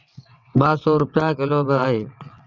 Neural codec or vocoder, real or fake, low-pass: vocoder, 22.05 kHz, 80 mel bands, WaveNeXt; fake; 7.2 kHz